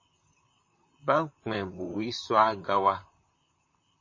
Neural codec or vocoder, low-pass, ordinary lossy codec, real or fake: vocoder, 44.1 kHz, 128 mel bands, Pupu-Vocoder; 7.2 kHz; MP3, 32 kbps; fake